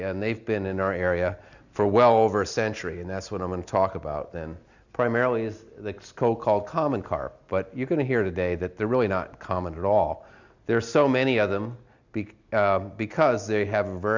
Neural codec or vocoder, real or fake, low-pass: none; real; 7.2 kHz